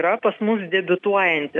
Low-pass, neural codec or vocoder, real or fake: 10.8 kHz; none; real